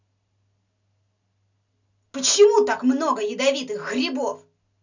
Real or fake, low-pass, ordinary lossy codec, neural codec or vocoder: real; 7.2 kHz; none; none